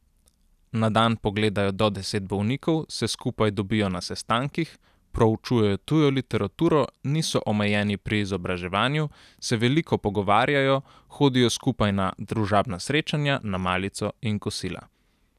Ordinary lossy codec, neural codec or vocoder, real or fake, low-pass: none; none; real; 14.4 kHz